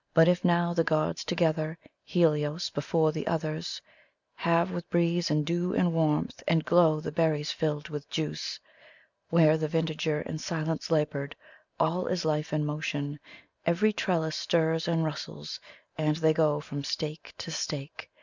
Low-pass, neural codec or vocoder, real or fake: 7.2 kHz; none; real